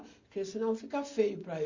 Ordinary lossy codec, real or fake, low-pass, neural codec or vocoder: Opus, 64 kbps; real; 7.2 kHz; none